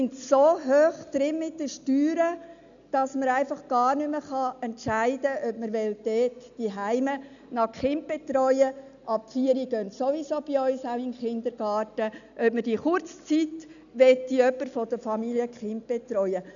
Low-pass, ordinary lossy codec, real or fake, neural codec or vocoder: 7.2 kHz; none; real; none